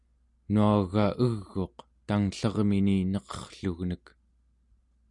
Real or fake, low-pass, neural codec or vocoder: real; 10.8 kHz; none